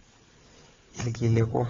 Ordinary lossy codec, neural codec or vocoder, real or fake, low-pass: AAC, 24 kbps; codec, 16 kHz, 4 kbps, FunCodec, trained on Chinese and English, 50 frames a second; fake; 7.2 kHz